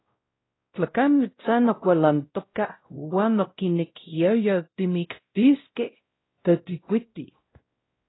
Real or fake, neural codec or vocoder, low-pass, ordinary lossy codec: fake; codec, 16 kHz, 0.5 kbps, X-Codec, WavLM features, trained on Multilingual LibriSpeech; 7.2 kHz; AAC, 16 kbps